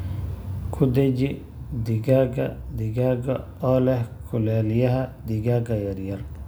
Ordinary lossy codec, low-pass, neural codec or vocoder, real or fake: none; none; none; real